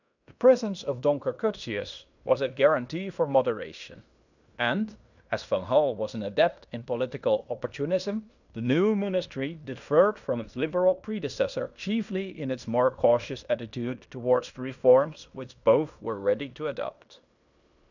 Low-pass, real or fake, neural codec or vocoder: 7.2 kHz; fake; codec, 16 kHz in and 24 kHz out, 0.9 kbps, LongCat-Audio-Codec, fine tuned four codebook decoder